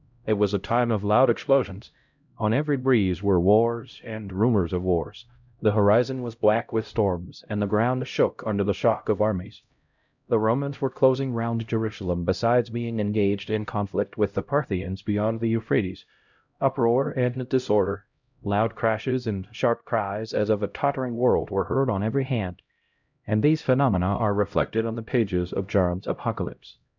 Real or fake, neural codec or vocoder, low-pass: fake; codec, 16 kHz, 0.5 kbps, X-Codec, HuBERT features, trained on LibriSpeech; 7.2 kHz